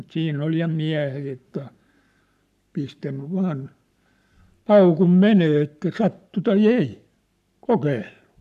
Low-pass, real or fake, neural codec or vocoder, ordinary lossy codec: 14.4 kHz; fake; codec, 44.1 kHz, 7.8 kbps, Pupu-Codec; none